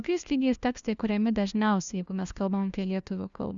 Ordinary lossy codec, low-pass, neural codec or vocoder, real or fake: Opus, 64 kbps; 7.2 kHz; codec, 16 kHz, 1 kbps, FunCodec, trained on LibriTTS, 50 frames a second; fake